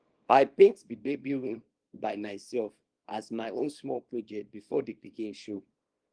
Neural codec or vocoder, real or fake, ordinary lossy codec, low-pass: codec, 24 kHz, 0.9 kbps, WavTokenizer, small release; fake; Opus, 24 kbps; 9.9 kHz